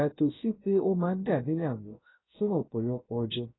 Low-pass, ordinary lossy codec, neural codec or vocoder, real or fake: 7.2 kHz; AAC, 16 kbps; codec, 16 kHz, 0.3 kbps, FocalCodec; fake